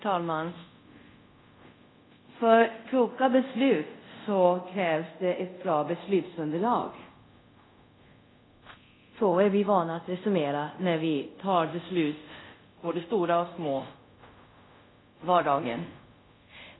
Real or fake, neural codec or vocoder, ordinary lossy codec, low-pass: fake; codec, 24 kHz, 0.5 kbps, DualCodec; AAC, 16 kbps; 7.2 kHz